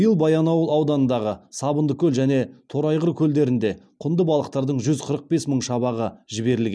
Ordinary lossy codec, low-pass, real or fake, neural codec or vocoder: none; none; real; none